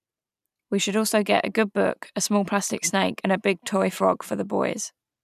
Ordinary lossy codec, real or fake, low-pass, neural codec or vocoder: none; real; 14.4 kHz; none